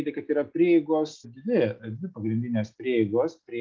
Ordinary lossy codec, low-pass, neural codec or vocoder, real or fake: Opus, 32 kbps; 7.2 kHz; none; real